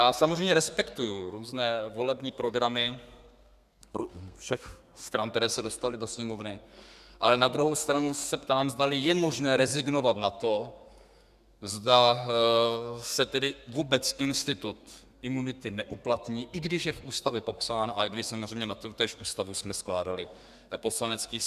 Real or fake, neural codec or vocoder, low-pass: fake; codec, 32 kHz, 1.9 kbps, SNAC; 14.4 kHz